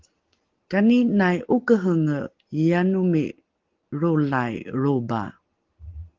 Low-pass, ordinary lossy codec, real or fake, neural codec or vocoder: 7.2 kHz; Opus, 16 kbps; real; none